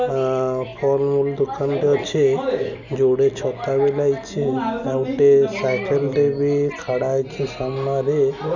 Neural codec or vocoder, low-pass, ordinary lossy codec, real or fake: none; 7.2 kHz; none; real